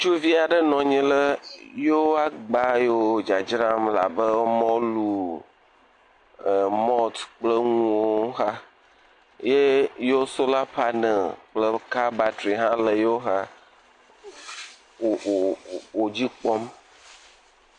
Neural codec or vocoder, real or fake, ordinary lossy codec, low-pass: none; real; AAC, 48 kbps; 9.9 kHz